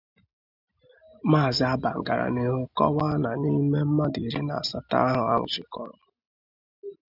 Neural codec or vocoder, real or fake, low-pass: none; real; 5.4 kHz